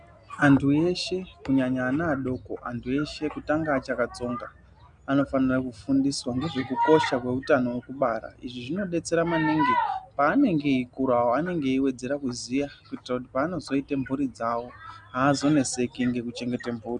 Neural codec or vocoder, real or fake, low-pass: none; real; 9.9 kHz